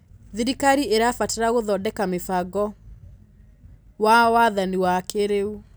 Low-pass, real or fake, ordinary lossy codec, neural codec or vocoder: none; real; none; none